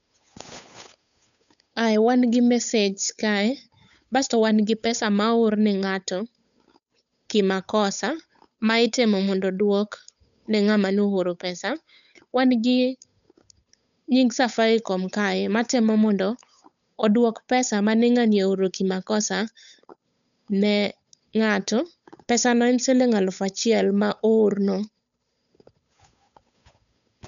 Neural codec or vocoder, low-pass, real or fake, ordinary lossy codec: codec, 16 kHz, 8 kbps, FunCodec, trained on Chinese and English, 25 frames a second; 7.2 kHz; fake; none